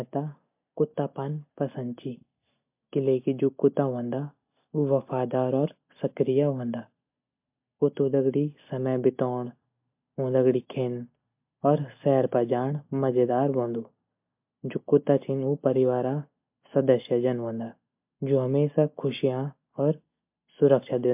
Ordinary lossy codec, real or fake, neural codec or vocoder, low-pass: MP3, 32 kbps; real; none; 3.6 kHz